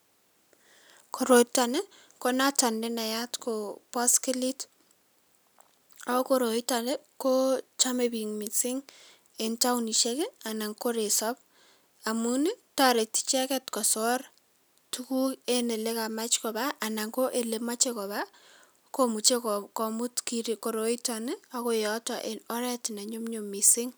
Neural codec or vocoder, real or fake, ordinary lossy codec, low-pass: none; real; none; none